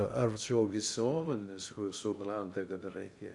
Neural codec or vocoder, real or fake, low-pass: codec, 16 kHz in and 24 kHz out, 0.6 kbps, FocalCodec, streaming, 2048 codes; fake; 10.8 kHz